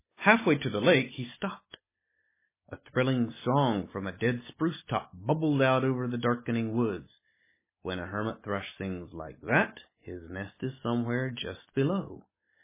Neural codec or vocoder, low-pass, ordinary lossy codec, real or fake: none; 3.6 kHz; MP3, 16 kbps; real